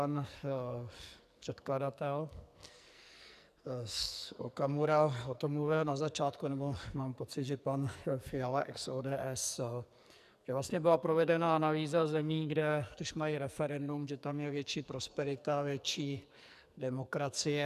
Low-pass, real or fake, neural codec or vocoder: 14.4 kHz; fake; codec, 44.1 kHz, 2.6 kbps, SNAC